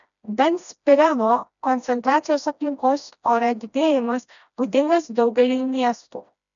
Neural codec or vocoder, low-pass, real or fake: codec, 16 kHz, 1 kbps, FreqCodec, smaller model; 7.2 kHz; fake